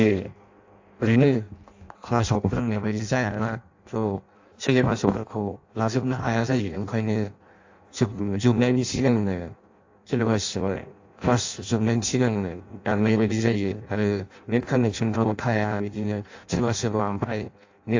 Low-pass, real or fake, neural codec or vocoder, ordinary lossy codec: 7.2 kHz; fake; codec, 16 kHz in and 24 kHz out, 0.6 kbps, FireRedTTS-2 codec; AAC, 48 kbps